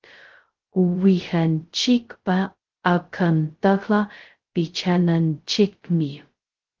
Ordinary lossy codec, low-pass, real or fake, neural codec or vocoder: Opus, 16 kbps; 7.2 kHz; fake; codec, 16 kHz, 0.2 kbps, FocalCodec